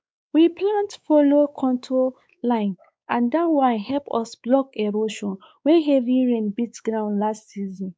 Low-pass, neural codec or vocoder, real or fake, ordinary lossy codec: none; codec, 16 kHz, 4 kbps, X-Codec, WavLM features, trained on Multilingual LibriSpeech; fake; none